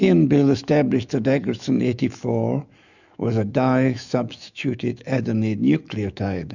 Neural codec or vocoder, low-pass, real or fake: autoencoder, 48 kHz, 128 numbers a frame, DAC-VAE, trained on Japanese speech; 7.2 kHz; fake